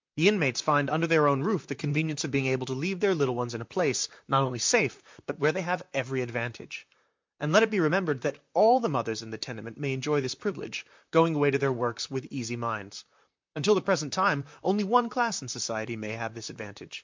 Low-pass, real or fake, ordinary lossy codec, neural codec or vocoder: 7.2 kHz; fake; MP3, 64 kbps; vocoder, 44.1 kHz, 128 mel bands, Pupu-Vocoder